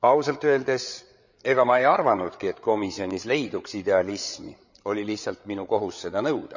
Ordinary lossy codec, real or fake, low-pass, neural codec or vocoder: none; fake; 7.2 kHz; codec, 16 kHz, 8 kbps, FreqCodec, larger model